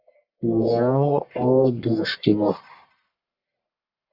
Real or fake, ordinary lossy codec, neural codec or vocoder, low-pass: fake; Opus, 64 kbps; codec, 44.1 kHz, 1.7 kbps, Pupu-Codec; 5.4 kHz